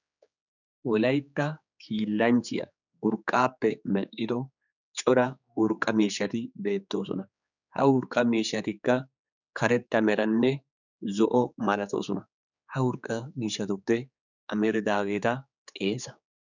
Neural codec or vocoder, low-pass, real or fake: codec, 16 kHz, 4 kbps, X-Codec, HuBERT features, trained on general audio; 7.2 kHz; fake